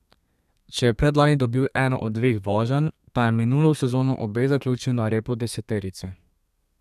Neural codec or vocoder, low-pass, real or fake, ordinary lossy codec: codec, 32 kHz, 1.9 kbps, SNAC; 14.4 kHz; fake; none